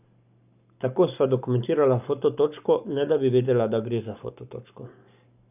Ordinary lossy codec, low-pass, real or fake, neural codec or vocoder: none; 3.6 kHz; fake; codec, 44.1 kHz, 7.8 kbps, Pupu-Codec